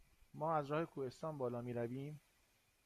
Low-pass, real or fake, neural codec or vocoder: 14.4 kHz; real; none